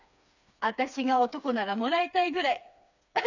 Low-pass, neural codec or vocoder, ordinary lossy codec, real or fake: 7.2 kHz; codec, 16 kHz, 4 kbps, FreqCodec, smaller model; none; fake